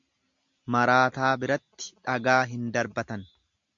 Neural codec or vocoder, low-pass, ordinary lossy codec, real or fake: none; 7.2 kHz; MP3, 64 kbps; real